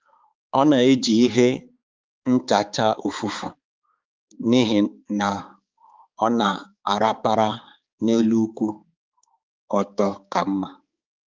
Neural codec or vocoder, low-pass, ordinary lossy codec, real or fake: codec, 16 kHz, 4 kbps, X-Codec, HuBERT features, trained on balanced general audio; 7.2 kHz; Opus, 24 kbps; fake